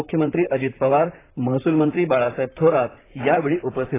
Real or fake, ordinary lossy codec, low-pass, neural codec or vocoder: fake; AAC, 16 kbps; 3.6 kHz; vocoder, 44.1 kHz, 128 mel bands, Pupu-Vocoder